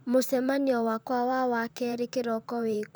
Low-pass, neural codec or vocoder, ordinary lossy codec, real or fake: none; vocoder, 44.1 kHz, 128 mel bands, Pupu-Vocoder; none; fake